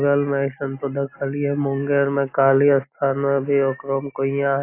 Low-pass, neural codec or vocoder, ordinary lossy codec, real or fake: 3.6 kHz; none; AAC, 24 kbps; real